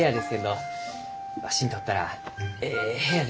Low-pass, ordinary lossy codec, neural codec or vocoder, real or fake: none; none; none; real